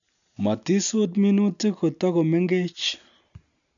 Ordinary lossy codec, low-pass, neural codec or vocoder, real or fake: none; 7.2 kHz; none; real